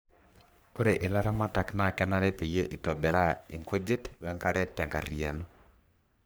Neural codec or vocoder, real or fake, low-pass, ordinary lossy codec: codec, 44.1 kHz, 3.4 kbps, Pupu-Codec; fake; none; none